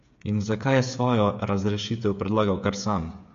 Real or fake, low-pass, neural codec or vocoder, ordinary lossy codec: fake; 7.2 kHz; codec, 16 kHz, 8 kbps, FreqCodec, smaller model; MP3, 48 kbps